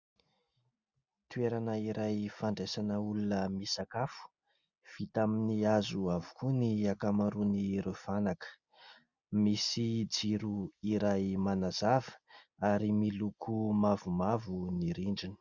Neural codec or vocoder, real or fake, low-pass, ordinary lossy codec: none; real; 7.2 kHz; Opus, 64 kbps